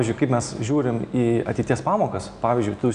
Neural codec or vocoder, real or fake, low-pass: none; real; 9.9 kHz